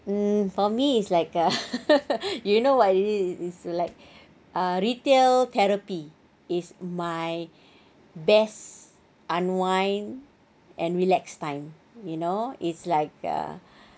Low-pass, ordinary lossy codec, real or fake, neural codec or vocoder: none; none; real; none